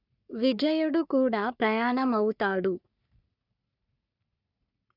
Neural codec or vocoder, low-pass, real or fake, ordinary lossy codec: codec, 44.1 kHz, 3.4 kbps, Pupu-Codec; 5.4 kHz; fake; none